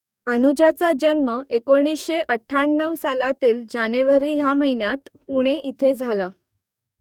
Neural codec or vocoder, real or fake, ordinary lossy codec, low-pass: codec, 44.1 kHz, 2.6 kbps, DAC; fake; none; 19.8 kHz